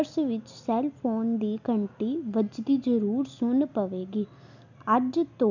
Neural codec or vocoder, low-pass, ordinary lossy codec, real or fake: none; 7.2 kHz; none; real